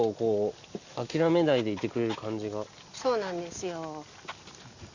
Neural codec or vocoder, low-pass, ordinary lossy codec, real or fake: none; 7.2 kHz; Opus, 64 kbps; real